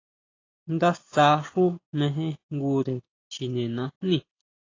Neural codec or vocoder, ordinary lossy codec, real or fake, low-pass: none; AAC, 32 kbps; real; 7.2 kHz